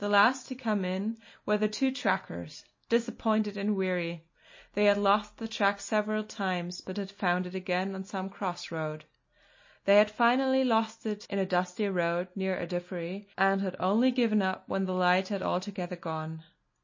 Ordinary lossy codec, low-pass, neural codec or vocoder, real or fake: MP3, 32 kbps; 7.2 kHz; none; real